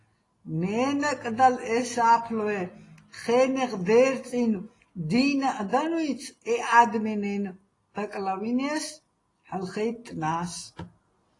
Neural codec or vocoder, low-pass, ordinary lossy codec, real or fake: none; 10.8 kHz; AAC, 32 kbps; real